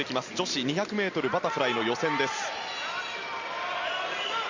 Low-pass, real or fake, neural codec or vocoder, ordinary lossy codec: 7.2 kHz; real; none; Opus, 64 kbps